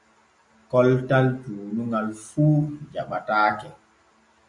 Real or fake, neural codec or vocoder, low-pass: real; none; 10.8 kHz